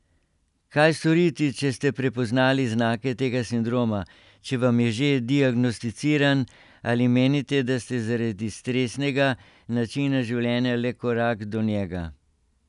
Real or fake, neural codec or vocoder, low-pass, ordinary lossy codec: real; none; 10.8 kHz; none